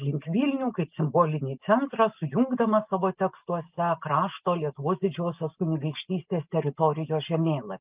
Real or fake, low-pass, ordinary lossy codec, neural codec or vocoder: real; 3.6 kHz; Opus, 32 kbps; none